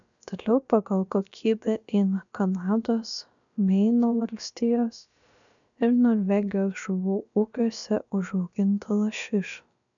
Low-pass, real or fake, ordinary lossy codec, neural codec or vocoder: 7.2 kHz; fake; MP3, 96 kbps; codec, 16 kHz, about 1 kbps, DyCAST, with the encoder's durations